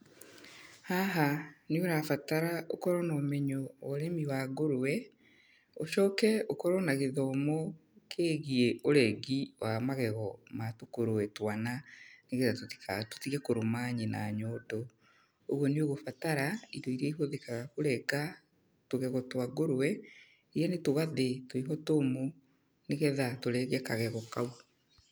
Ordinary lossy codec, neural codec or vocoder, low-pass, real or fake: none; none; none; real